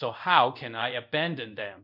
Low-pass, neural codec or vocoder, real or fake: 5.4 kHz; codec, 24 kHz, 0.5 kbps, DualCodec; fake